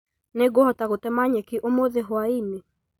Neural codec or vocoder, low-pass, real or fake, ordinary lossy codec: none; 19.8 kHz; real; none